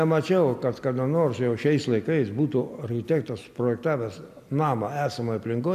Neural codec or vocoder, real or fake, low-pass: none; real; 14.4 kHz